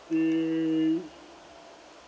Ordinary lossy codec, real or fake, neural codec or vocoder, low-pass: none; real; none; none